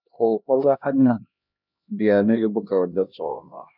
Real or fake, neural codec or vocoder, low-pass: fake; codec, 16 kHz, 1 kbps, X-Codec, HuBERT features, trained on LibriSpeech; 5.4 kHz